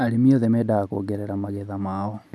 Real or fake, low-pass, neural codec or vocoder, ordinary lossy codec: real; none; none; none